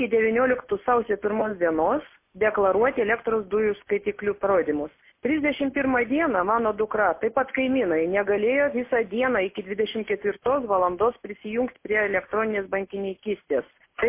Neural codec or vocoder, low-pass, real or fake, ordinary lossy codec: none; 3.6 kHz; real; MP3, 24 kbps